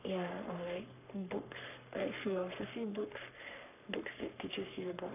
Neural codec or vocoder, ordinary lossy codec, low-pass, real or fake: codec, 44.1 kHz, 3.4 kbps, Pupu-Codec; none; 3.6 kHz; fake